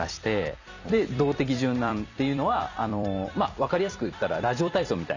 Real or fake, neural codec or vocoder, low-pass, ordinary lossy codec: fake; vocoder, 44.1 kHz, 128 mel bands every 256 samples, BigVGAN v2; 7.2 kHz; none